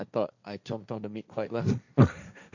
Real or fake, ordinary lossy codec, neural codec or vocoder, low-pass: fake; none; codec, 16 kHz, 1.1 kbps, Voila-Tokenizer; 7.2 kHz